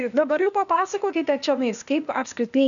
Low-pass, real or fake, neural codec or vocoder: 7.2 kHz; fake; codec, 16 kHz, 0.8 kbps, ZipCodec